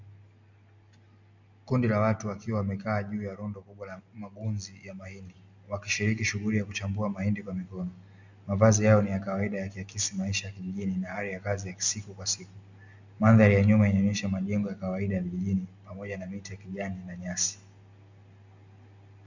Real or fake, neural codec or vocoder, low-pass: real; none; 7.2 kHz